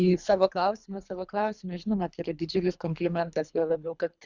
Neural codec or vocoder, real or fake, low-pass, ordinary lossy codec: codec, 24 kHz, 3 kbps, HILCodec; fake; 7.2 kHz; Opus, 64 kbps